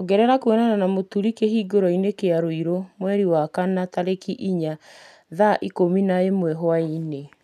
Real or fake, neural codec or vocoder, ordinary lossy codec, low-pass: real; none; none; 14.4 kHz